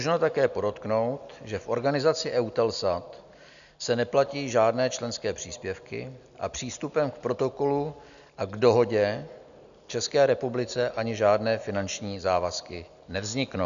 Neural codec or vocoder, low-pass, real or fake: none; 7.2 kHz; real